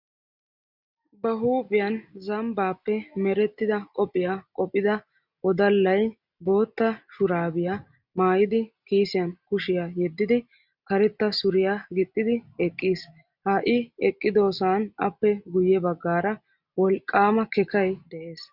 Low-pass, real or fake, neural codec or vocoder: 5.4 kHz; real; none